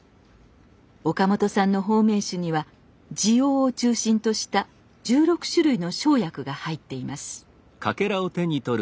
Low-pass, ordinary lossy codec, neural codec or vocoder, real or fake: none; none; none; real